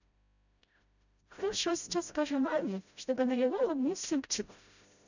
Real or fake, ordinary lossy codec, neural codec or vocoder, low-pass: fake; none; codec, 16 kHz, 0.5 kbps, FreqCodec, smaller model; 7.2 kHz